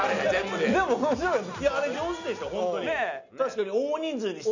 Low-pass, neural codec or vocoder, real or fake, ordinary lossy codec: 7.2 kHz; none; real; none